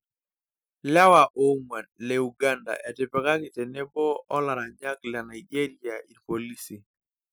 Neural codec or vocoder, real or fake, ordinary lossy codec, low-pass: none; real; none; none